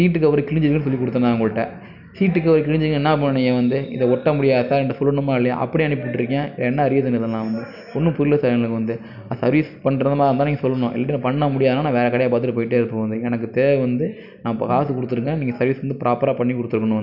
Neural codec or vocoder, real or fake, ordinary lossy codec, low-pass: none; real; none; 5.4 kHz